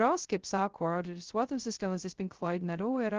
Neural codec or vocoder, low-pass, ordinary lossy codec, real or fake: codec, 16 kHz, 0.2 kbps, FocalCodec; 7.2 kHz; Opus, 16 kbps; fake